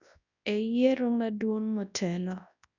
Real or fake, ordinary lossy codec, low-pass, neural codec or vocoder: fake; none; 7.2 kHz; codec, 24 kHz, 0.9 kbps, WavTokenizer, large speech release